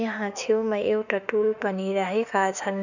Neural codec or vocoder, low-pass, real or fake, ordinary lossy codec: autoencoder, 48 kHz, 32 numbers a frame, DAC-VAE, trained on Japanese speech; 7.2 kHz; fake; none